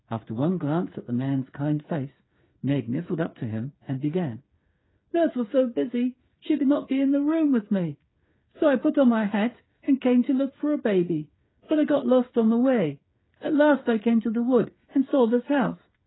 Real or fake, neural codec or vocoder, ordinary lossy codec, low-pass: fake; codec, 16 kHz, 8 kbps, FreqCodec, smaller model; AAC, 16 kbps; 7.2 kHz